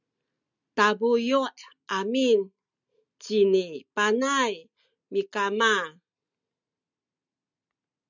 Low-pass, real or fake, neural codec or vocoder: 7.2 kHz; real; none